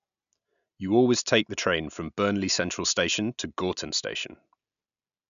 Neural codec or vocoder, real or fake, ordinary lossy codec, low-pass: none; real; none; 7.2 kHz